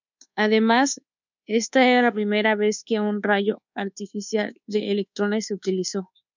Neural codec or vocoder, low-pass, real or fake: codec, 24 kHz, 1.2 kbps, DualCodec; 7.2 kHz; fake